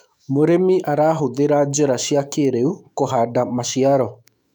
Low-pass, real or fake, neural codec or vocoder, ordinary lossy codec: 19.8 kHz; fake; autoencoder, 48 kHz, 128 numbers a frame, DAC-VAE, trained on Japanese speech; none